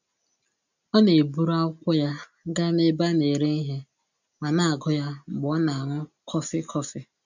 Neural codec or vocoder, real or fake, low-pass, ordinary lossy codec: none; real; 7.2 kHz; none